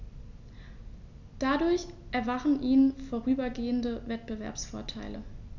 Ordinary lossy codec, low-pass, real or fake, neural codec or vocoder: none; 7.2 kHz; real; none